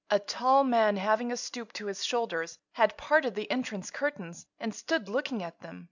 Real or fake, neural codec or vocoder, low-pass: real; none; 7.2 kHz